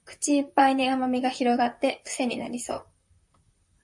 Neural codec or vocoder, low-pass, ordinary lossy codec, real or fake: vocoder, 44.1 kHz, 128 mel bands, Pupu-Vocoder; 10.8 kHz; MP3, 48 kbps; fake